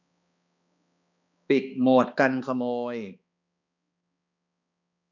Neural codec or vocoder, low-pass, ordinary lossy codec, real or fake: codec, 16 kHz, 2 kbps, X-Codec, HuBERT features, trained on balanced general audio; 7.2 kHz; none; fake